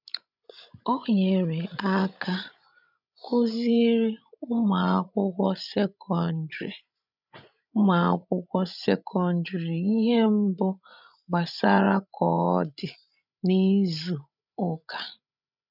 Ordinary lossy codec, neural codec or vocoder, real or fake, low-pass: none; codec, 16 kHz, 16 kbps, FreqCodec, larger model; fake; 5.4 kHz